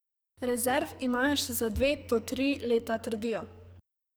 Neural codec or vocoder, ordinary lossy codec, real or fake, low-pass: codec, 44.1 kHz, 2.6 kbps, SNAC; none; fake; none